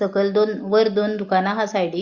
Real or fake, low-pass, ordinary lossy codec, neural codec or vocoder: real; 7.2 kHz; Opus, 64 kbps; none